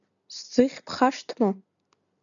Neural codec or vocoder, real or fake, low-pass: none; real; 7.2 kHz